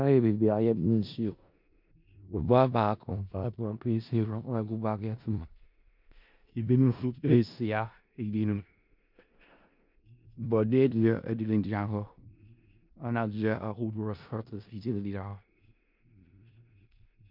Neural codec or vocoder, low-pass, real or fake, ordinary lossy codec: codec, 16 kHz in and 24 kHz out, 0.4 kbps, LongCat-Audio-Codec, four codebook decoder; 5.4 kHz; fake; MP3, 48 kbps